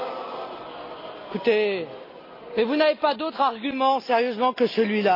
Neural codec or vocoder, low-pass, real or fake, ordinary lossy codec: none; 5.4 kHz; real; AAC, 32 kbps